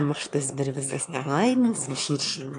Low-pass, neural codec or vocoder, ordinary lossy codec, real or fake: 9.9 kHz; autoencoder, 22.05 kHz, a latent of 192 numbers a frame, VITS, trained on one speaker; AAC, 64 kbps; fake